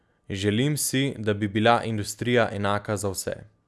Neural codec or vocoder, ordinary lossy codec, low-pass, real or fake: none; none; none; real